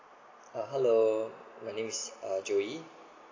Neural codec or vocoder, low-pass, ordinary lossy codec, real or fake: none; 7.2 kHz; AAC, 32 kbps; real